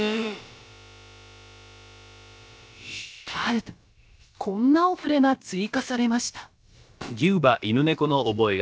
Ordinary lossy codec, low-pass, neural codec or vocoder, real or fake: none; none; codec, 16 kHz, about 1 kbps, DyCAST, with the encoder's durations; fake